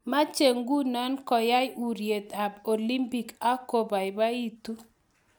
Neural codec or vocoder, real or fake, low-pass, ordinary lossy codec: none; real; none; none